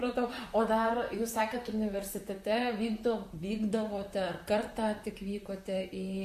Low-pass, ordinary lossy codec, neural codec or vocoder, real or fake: 14.4 kHz; MP3, 64 kbps; vocoder, 44.1 kHz, 128 mel bands, Pupu-Vocoder; fake